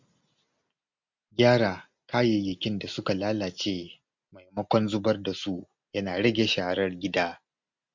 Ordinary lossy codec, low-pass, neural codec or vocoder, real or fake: MP3, 64 kbps; 7.2 kHz; none; real